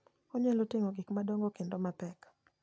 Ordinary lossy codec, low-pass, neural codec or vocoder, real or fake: none; none; none; real